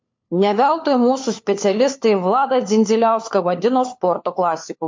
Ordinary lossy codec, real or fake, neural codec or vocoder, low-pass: MP3, 48 kbps; fake; codec, 16 kHz, 4 kbps, FunCodec, trained on LibriTTS, 50 frames a second; 7.2 kHz